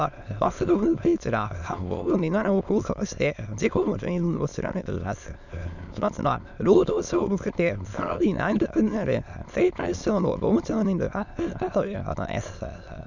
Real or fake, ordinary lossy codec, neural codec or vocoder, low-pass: fake; none; autoencoder, 22.05 kHz, a latent of 192 numbers a frame, VITS, trained on many speakers; 7.2 kHz